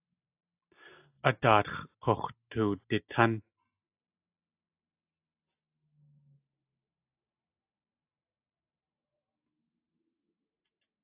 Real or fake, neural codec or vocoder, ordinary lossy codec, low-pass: real; none; AAC, 32 kbps; 3.6 kHz